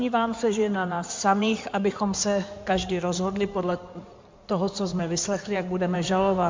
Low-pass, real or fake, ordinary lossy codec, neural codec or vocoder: 7.2 kHz; fake; MP3, 64 kbps; codec, 16 kHz in and 24 kHz out, 2.2 kbps, FireRedTTS-2 codec